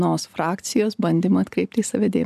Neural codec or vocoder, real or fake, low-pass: none; real; 14.4 kHz